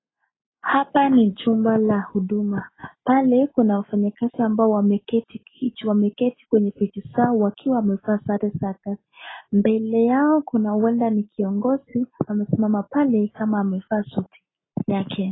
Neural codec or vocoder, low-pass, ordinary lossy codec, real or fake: none; 7.2 kHz; AAC, 16 kbps; real